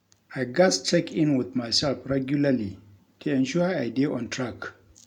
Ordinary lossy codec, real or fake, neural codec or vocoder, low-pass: none; real; none; 19.8 kHz